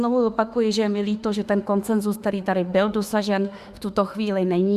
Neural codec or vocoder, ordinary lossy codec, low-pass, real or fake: autoencoder, 48 kHz, 32 numbers a frame, DAC-VAE, trained on Japanese speech; Opus, 64 kbps; 14.4 kHz; fake